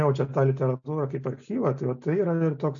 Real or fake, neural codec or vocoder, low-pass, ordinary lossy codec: real; none; 7.2 kHz; AAC, 64 kbps